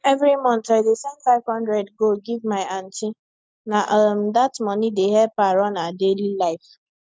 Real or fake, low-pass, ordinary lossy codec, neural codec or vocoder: real; none; none; none